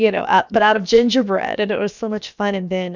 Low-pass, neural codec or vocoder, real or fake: 7.2 kHz; codec, 16 kHz, about 1 kbps, DyCAST, with the encoder's durations; fake